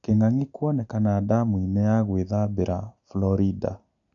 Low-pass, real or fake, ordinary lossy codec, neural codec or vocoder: 7.2 kHz; real; none; none